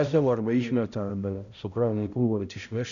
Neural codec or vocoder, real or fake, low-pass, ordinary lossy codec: codec, 16 kHz, 0.5 kbps, X-Codec, HuBERT features, trained on balanced general audio; fake; 7.2 kHz; Opus, 64 kbps